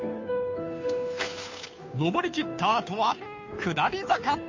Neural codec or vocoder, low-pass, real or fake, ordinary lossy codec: codec, 16 kHz, 2 kbps, FunCodec, trained on Chinese and English, 25 frames a second; 7.2 kHz; fake; MP3, 48 kbps